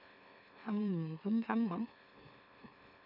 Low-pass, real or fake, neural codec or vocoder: 5.4 kHz; fake; autoencoder, 44.1 kHz, a latent of 192 numbers a frame, MeloTTS